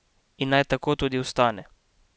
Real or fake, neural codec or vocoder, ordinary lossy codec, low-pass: real; none; none; none